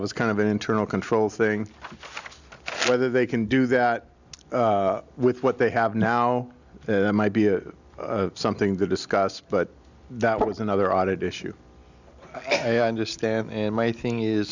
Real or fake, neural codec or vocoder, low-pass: real; none; 7.2 kHz